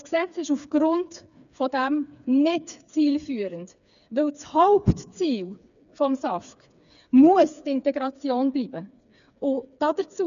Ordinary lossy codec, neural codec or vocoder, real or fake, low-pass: none; codec, 16 kHz, 4 kbps, FreqCodec, smaller model; fake; 7.2 kHz